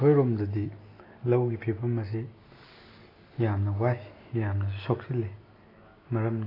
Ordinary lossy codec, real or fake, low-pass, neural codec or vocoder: AAC, 24 kbps; real; 5.4 kHz; none